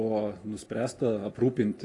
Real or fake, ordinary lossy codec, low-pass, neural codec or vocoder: real; AAC, 32 kbps; 10.8 kHz; none